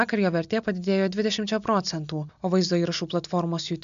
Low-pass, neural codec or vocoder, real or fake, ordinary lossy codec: 7.2 kHz; none; real; MP3, 64 kbps